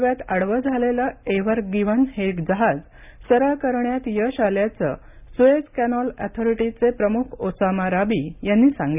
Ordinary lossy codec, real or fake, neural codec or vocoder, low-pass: none; real; none; 3.6 kHz